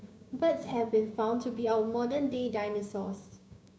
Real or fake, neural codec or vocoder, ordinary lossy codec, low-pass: fake; codec, 16 kHz, 6 kbps, DAC; none; none